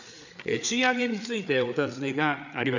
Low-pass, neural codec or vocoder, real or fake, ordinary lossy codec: 7.2 kHz; codec, 16 kHz, 8 kbps, FreqCodec, larger model; fake; none